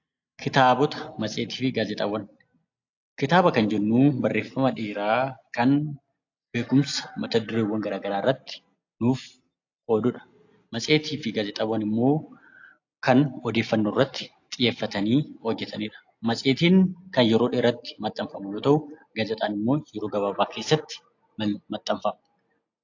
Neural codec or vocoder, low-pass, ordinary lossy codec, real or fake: none; 7.2 kHz; AAC, 48 kbps; real